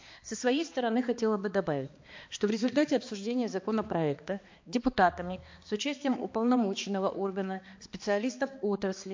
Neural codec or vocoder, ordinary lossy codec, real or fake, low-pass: codec, 16 kHz, 2 kbps, X-Codec, HuBERT features, trained on balanced general audio; MP3, 48 kbps; fake; 7.2 kHz